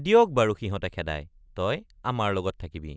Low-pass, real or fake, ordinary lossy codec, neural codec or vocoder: none; real; none; none